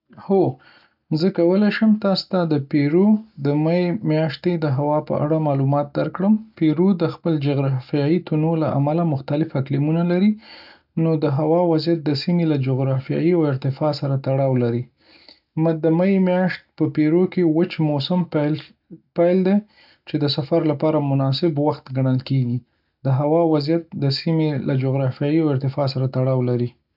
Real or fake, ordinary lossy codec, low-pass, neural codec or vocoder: real; none; 5.4 kHz; none